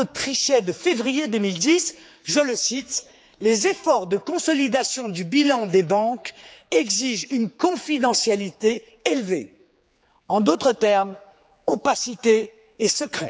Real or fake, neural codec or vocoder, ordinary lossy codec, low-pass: fake; codec, 16 kHz, 4 kbps, X-Codec, HuBERT features, trained on general audio; none; none